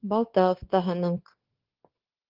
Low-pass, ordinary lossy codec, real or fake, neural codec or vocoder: 5.4 kHz; Opus, 16 kbps; fake; codec, 16 kHz, 0.9 kbps, LongCat-Audio-Codec